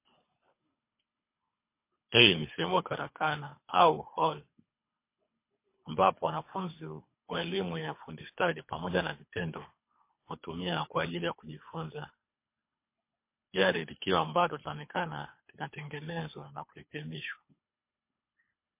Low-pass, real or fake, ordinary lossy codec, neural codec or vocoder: 3.6 kHz; fake; MP3, 24 kbps; codec, 24 kHz, 3 kbps, HILCodec